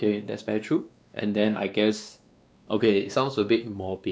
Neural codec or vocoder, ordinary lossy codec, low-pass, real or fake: codec, 16 kHz, 2 kbps, X-Codec, WavLM features, trained on Multilingual LibriSpeech; none; none; fake